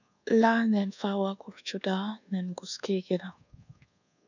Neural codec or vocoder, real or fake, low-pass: codec, 24 kHz, 1.2 kbps, DualCodec; fake; 7.2 kHz